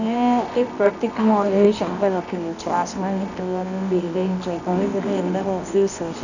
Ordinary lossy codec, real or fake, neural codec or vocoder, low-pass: none; fake; codec, 24 kHz, 0.9 kbps, WavTokenizer, medium music audio release; 7.2 kHz